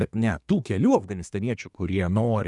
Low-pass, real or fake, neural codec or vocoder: 10.8 kHz; fake; codec, 24 kHz, 1 kbps, SNAC